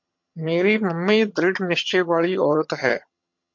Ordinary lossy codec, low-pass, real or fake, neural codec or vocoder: MP3, 48 kbps; 7.2 kHz; fake; vocoder, 22.05 kHz, 80 mel bands, HiFi-GAN